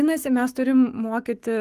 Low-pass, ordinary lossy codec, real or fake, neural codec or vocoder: 14.4 kHz; Opus, 32 kbps; fake; codec, 44.1 kHz, 7.8 kbps, Pupu-Codec